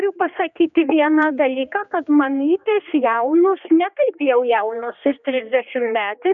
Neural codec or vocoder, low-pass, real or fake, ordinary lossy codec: codec, 16 kHz, 2 kbps, X-Codec, HuBERT features, trained on general audio; 7.2 kHz; fake; MP3, 96 kbps